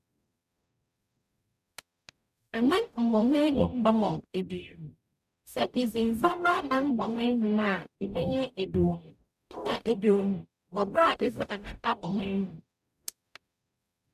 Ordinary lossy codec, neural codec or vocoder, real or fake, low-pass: none; codec, 44.1 kHz, 0.9 kbps, DAC; fake; 14.4 kHz